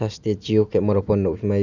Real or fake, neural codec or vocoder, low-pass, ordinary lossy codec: real; none; 7.2 kHz; none